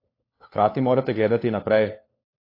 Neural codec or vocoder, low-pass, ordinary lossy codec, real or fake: codec, 16 kHz, 4 kbps, FunCodec, trained on LibriTTS, 50 frames a second; 5.4 kHz; AAC, 32 kbps; fake